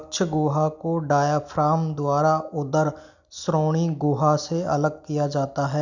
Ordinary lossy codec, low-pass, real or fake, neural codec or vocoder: none; 7.2 kHz; real; none